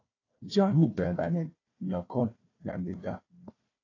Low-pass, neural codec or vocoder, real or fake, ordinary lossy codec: 7.2 kHz; codec, 16 kHz, 1 kbps, FunCodec, trained on Chinese and English, 50 frames a second; fake; AAC, 32 kbps